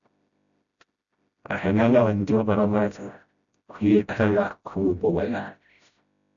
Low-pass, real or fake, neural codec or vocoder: 7.2 kHz; fake; codec, 16 kHz, 0.5 kbps, FreqCodec, smaller model